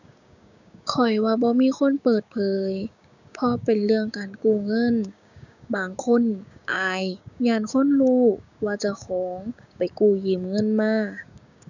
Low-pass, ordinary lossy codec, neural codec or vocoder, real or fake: 7.2 kHz; none; codec, 16 kHz, 6 kbps, DAC; fake